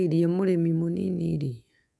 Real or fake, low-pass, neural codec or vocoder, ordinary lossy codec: fake; none; codec, 24 kHz, 3.1 kbps, DualCodec; none